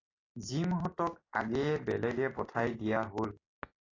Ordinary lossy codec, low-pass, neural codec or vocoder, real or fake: AAC, 32 kbps; 7.2 kHz; none; real